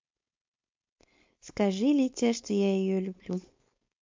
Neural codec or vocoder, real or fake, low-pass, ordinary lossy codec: codec, 16 kHz, 4.8 kbps, FACodec; fake; 7.2 kHz; MP3, 48 kbps